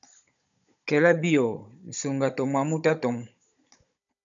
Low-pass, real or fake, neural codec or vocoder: 7.2 kHz; fake; codec, 16 kHz, 16 kbps, FunCodec, trained on Chinese and English, 50 frames a second